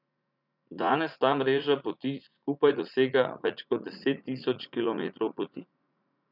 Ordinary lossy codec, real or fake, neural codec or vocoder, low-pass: none; fake; vocoder, 44.1 kHz, 80 mel bands, Vocos; 5.4 kHz